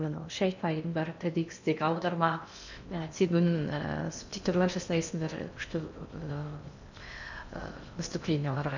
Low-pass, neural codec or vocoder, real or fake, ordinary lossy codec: 7.2 kHz; codec, 16 kHz in and 24 kHz out, 0.6 kbps, FocalCodec, streaming, 2048 codes; fake; none